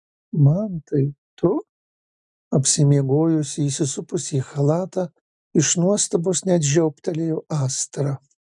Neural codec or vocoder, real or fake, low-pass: none; real; 10.8 kHz